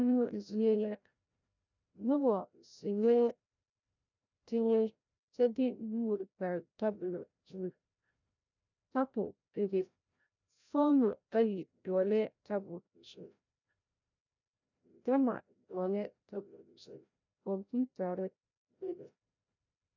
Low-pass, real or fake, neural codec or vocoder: 7.2 kHz; fake; codec, 16 kHz, 0.5 kbps, FreqCodec, larger model